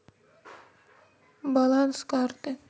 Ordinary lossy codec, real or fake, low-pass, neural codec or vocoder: none; real; none; none